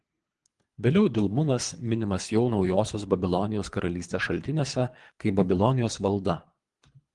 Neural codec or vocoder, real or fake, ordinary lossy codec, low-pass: codec, 24 kHz, 3 kbps, HILCodec; fake; Opus, 24 kbps; 10.8 kHz